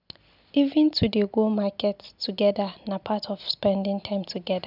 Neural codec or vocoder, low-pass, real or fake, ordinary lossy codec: none; 5.4 kHz; real; none